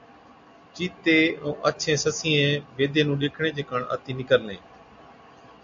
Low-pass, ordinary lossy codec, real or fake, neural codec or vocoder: 7.2 kHz; AAC, 64 kbps; real; none